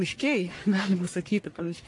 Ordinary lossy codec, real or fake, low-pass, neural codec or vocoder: AAC, 48 kbps; fake; 10.8 kHz; codec, 44.1 kHz, 1.7 kbps, Pupu-Codec